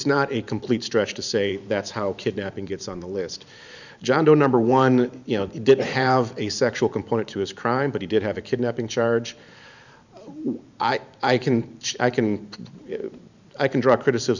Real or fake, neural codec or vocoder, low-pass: fake; vocoder, 44.1 kHz, 128 mel bands every 512 samples, BigVGAN v2; 7.2 kHz